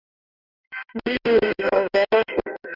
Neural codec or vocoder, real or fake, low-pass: codec, 16 kHz in and 24 kHz out, 1 kbps, XY-Tokenizer; fake; 5.4 kHz